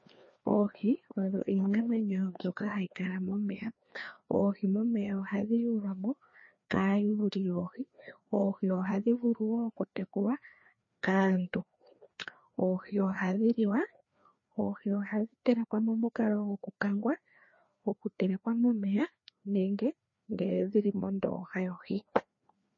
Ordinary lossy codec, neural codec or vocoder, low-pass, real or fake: MP3, 32 kbps; codec, 16 kHz, 2 kbps, FreqCodec, larger model; 7.2 kHz; fake